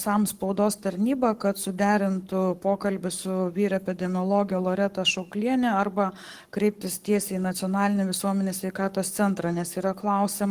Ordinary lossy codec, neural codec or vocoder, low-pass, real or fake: Opus, 24 kbps; codec, 44.1 kHz, 7.8 kbps, Pupu-Codec; 14.4 kHz; fake